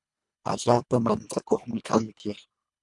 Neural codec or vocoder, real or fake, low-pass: codec, 24 kHz, 1.5 kbps, HILCodec; fake; 10.8 kHz